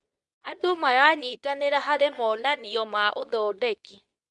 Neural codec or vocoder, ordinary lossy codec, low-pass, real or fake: codec, 24 kHz, 0.9 kbps, WavTokenizer, medium speech release version 2; none; 10.8 kHz; fake